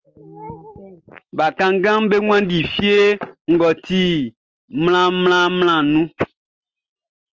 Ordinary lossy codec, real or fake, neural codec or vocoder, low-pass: Opus, 24 kbps; real; none; 7.2 kHz